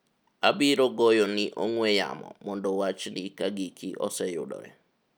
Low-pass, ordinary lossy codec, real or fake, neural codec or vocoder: none; none; real; none